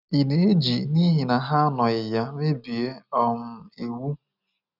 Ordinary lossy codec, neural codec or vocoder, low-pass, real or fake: none; none; 5.4 kHz; real